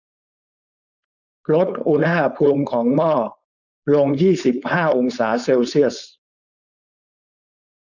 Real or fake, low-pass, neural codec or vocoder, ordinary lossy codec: fake; 7.2 kHz; codec, 16 kHz, 4.8 kbps, FACodec; none